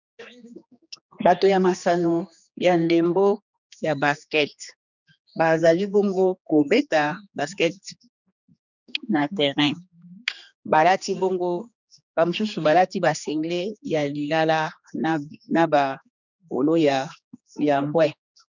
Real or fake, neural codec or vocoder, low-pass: fake; codec, 16 kHz, 2 kbps, X-Codec, HuBERT features, trained on general audio; 7.2 kHz